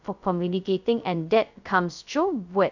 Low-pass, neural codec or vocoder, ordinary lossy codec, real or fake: 7.2 kHz; codec, 16 kHz, 0.2 kbps, FocalCodec; none; fake